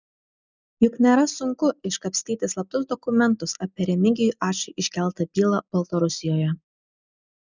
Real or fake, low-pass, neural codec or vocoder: real; 7.2 kHz; none